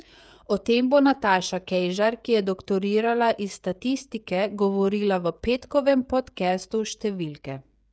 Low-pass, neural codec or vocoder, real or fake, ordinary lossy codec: none; codec, 16 kHz, 16 kbps, FreqCodec, smaller model; fake; none